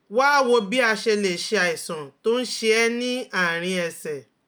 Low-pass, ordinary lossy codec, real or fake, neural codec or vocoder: none; none; real; none